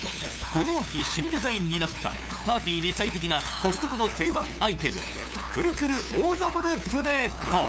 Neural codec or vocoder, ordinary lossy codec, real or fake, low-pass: codec, 16 kHz, 2 kbps, FunCodec, trained on LibriTTS, 25 frames a second; none; fake; none